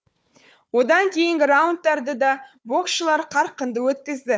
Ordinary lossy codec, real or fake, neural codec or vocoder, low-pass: none; fake; codec, 16 kHz, 4 kbps, FunCodec, trained on Chinese and English, 50 frames a second; none